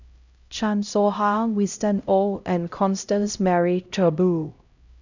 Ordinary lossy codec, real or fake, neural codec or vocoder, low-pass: none; fake; codec, 16 kHz, 0.5 kbps, X-Codec, HuBERT features, trained on LibriSpeech; 7.2 kHz